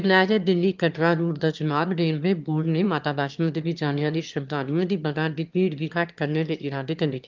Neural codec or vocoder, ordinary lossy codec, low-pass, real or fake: autoencoder, 22.05 kHz, a latent of 192 numbers a frame, VITS, trained on one speaker; Opus, 32 kbps; 7.2 kHz; fake